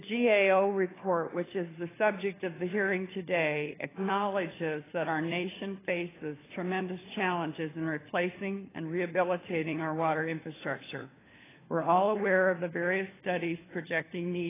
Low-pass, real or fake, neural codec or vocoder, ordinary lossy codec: 3.6 kHz; fake; codec, 16 kHz, 4 kbps, FunCodec, trained on LibriTTS, 50 frames a second; AAC, 16 kbps